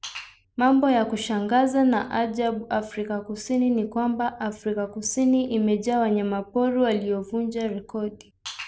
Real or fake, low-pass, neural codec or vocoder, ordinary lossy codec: real; none; none; none